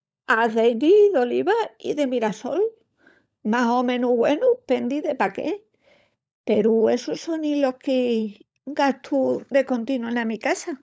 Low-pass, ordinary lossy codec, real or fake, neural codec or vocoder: none; none; fake; codec, 16 kHz, 16 kbps, FunCodec, trained on LibriTTS, 50 frames a second